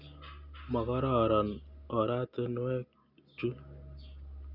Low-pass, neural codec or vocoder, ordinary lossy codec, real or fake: 5.4 kHz; none; none; real